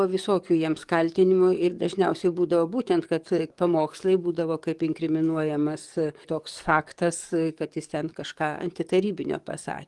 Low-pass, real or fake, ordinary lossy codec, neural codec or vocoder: 10.8 kHz; fake; Opus, 24 kbps; codec, 44.1 kHz, 7.8 kbps, DAC